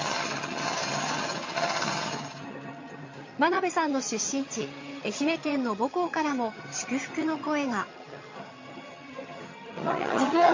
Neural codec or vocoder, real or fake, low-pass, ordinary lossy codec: vocoder, 22.05 kHz, 80 mel bands, HiFi-GAN; fake; 7.2 kHz; MP3, 32 kbps